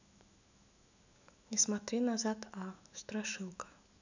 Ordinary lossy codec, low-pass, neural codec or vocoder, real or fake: none; 7.2 kHz; autoencoder, 48 kHz, 128 numbers a frame, DAC-VAE, trained on Japanese speech; fake